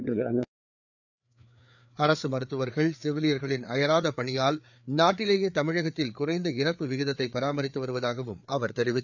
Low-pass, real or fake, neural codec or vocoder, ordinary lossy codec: 7.2 kHz; fake; codec, 16 kHz, 4 kbps, FreqCodec, larger model; none